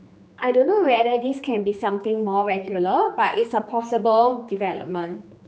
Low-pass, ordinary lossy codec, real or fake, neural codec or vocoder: none; none; fake; codec, 16 kHz, 2 kbps, X-Codec, HuBERT features, trained on general audio